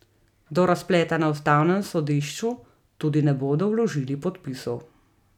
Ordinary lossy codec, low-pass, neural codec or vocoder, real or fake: none; 19.8 kHz; none; real